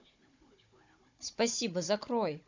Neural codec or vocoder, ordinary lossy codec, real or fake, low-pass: codec, 16 kHz, 16 kbps, FunCodec, trained on Chinese and English, 50 frames a second; MP3, 64 kbps; fake; 7.2 kHz